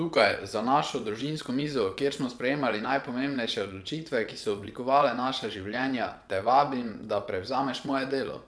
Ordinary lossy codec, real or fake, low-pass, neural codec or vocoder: none; fake; none; vocoder, 22.05 kHz, 80 mel bands, WaveNeXt